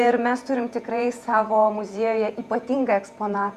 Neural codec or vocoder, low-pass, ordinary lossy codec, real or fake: vocoder, 48 kHz, 128 mel bands, Vocos; 14.4 kHz; Opus, 64 kbps; fake